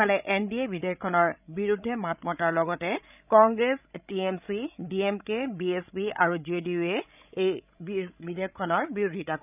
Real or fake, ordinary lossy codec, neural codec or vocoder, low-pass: fake; none; codec, 16 kHz, 16 kbps, FreqCodec, larger model; 3.6 kHz